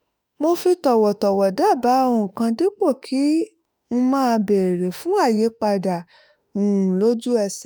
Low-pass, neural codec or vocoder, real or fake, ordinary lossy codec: none; autoencoder, 48 kHz, 32 numbers a frame, DAC-VAE, trained on Japanese speech; fake; none